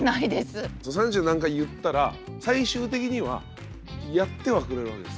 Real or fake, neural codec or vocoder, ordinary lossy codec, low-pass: real; none; none; none